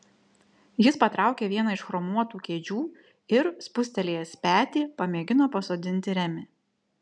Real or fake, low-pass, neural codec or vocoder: real; 9.9 kHz; none